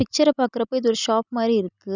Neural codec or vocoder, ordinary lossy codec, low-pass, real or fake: none; none; 7.2 kHz; real